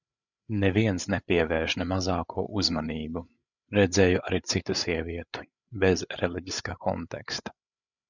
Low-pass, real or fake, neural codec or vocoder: 7.2 kHz; fake; codec, 16 kHz, 8 kbps, FreqCodec, larger model